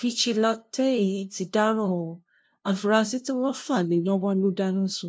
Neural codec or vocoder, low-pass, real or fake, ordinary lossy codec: codec, 16 kHz, 0.5 kbps, FunCodec, trained on LibriTTS, 25 frames a second; none; fake; none